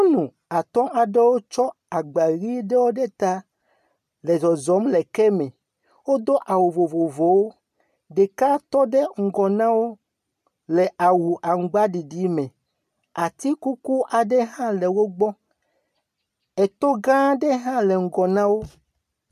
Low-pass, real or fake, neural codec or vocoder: 14.4 kHz; real; none